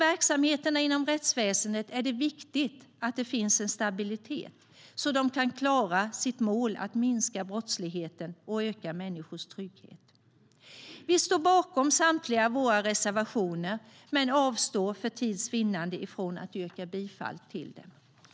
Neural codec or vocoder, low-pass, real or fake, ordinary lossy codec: none; none; real; none